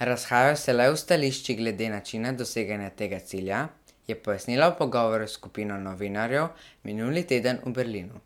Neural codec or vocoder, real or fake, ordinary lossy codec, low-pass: none; real; MP3, 96 kbps; 19.8 kHz